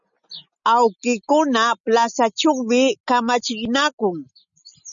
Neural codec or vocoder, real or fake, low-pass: none; real; 7.2 kHz